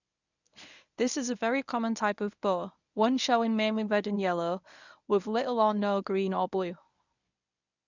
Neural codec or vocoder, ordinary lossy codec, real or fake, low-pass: codec, 24 kHz, 0.9 kbps, WavTokenizer, medium speech release version 1; none; fake; 7.2 kHz